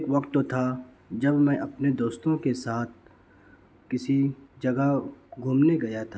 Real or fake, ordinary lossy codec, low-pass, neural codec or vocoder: real; none; none; none